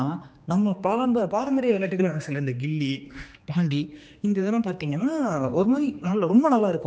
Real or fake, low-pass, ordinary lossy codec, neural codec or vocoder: fake; none; none; codec, 16 kHz, 2 kbps, X-Codec, HuBERT features, trained on general audio